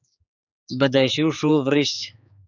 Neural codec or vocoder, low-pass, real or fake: codec, 16 kHz, 4 kbps, X-Codec, HuBERT features, trained on general audio; 7.2 kHz; fake